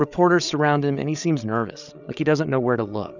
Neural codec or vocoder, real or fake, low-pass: codec, 16 kHz, 8 kbps, FreqCodec, larger model; fake; 7.2 kHz